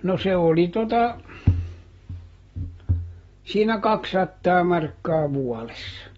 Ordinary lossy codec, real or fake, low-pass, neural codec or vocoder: AAC, 24 kbps; real; 19.8 kHz; none